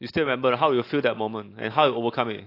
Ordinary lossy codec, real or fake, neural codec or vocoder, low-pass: AAC, 32 kbps; real; none; 5.4 kHz